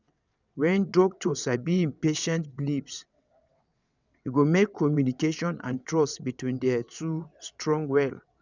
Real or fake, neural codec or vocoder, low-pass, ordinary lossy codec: fake; vocoder, 22.05 kHz, 80 mel bands, WaveNeXt; 7.2 kHz; none